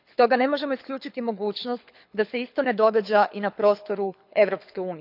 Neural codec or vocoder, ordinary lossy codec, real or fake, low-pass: codec, 24 kHz, 6 kbps, HILCodec; none; fake; 5.4 kHz